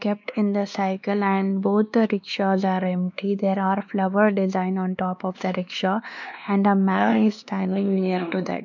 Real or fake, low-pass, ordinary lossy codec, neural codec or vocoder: fake; 7.2 kHz; none; codec, 16 kHz, 2 kbps, X-Codec, WavLM features, trained on Multilingual LibriSpeech